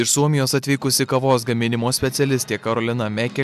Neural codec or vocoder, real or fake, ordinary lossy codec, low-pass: none; real; MP3, 96 kbps; 14.4 kHz